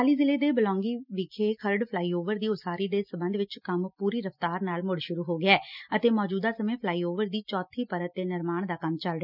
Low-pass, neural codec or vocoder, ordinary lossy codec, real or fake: 5.4 kHz; none; none; real